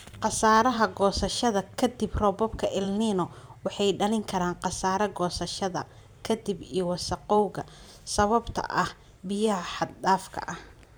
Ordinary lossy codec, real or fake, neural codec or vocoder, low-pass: none; fake; vocoder, 44.1 kHz, 128 mel bands every 512 samples, BigVGAN v2; none